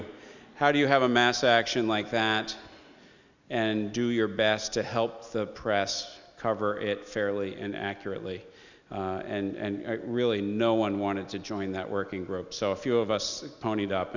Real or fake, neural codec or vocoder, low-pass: real; none; 7.2 kHz